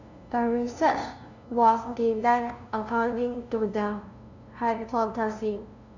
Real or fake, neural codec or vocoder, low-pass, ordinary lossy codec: fake; codec, 16 kHz, 0.5 kbps, FunCodec, trained on LibriTTS, 25 frames a second; 7.2 kHz; none